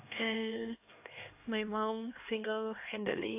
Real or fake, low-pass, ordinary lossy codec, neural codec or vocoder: fake; 3.6 kHz; AAC, 32 kbps; codec, 16 kHz, 2 kbps, X-Codec, HuBERT features, trained on LibriSpeech